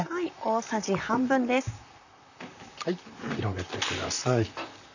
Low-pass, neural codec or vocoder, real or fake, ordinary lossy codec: 7.2 kHz; vocoder, 44.1 kHz, 128 mel bands, Pupu-Vocoder; fake; none